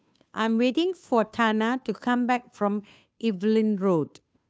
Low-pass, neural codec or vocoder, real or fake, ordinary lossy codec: none; codec, 16 kHz, 2 kbps, FunCodec, trained on Chinese and English, 25 frames a second; fake; none